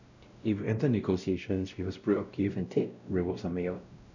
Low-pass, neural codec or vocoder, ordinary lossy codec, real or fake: 7.2 kHz; codec, 16 kHz, 0.5 kbps, X-Codec, WavLM features, trained on Multilingual LibriSpeech; none; fake